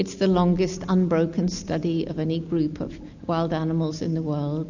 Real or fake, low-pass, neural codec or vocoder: real; 7.2 kHz; none